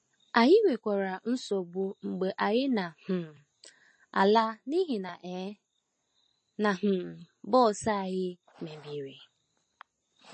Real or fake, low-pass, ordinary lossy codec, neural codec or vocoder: real; 9.9 kHz; MP3, 32 kbps; none